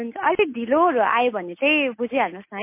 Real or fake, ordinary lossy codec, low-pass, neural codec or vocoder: real; MP3, 24 kbps; 3.6 kHz; none